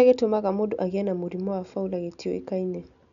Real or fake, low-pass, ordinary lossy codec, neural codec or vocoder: real; 7.2 kHz; none; none